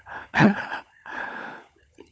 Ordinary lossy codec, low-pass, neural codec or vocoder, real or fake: none; none; codec, 16 kHz, 16 kbps, FunCodec, trained on LibriTTS, 50 frames a second; fake